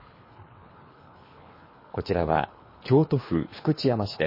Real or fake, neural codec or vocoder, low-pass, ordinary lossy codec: fake; codec, 24 kHz, 3 kbps, HILCodec; 5.4 kHz; MP3, 24 kbps